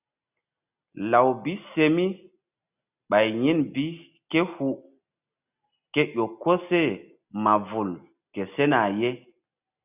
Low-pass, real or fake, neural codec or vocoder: 3.6 kHz; real; none